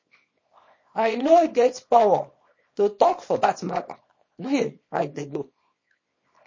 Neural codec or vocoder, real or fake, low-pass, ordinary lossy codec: codec, 24 kHz, 0.9 kbps, WavTokenizer, small release; fake; 7.2 kHz; MP3, 32 kbps